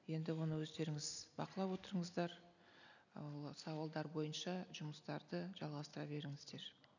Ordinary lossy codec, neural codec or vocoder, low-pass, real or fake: none; none; 7.2 kHz; real